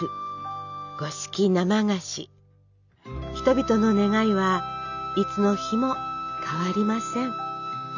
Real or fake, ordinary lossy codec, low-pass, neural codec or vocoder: real; none; 7.2 kHz; none